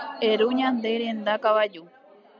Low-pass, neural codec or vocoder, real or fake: 7.2 kHz; none; real